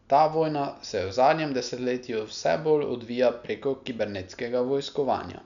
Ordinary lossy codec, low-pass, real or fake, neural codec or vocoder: none; 7.2 kHz; real; none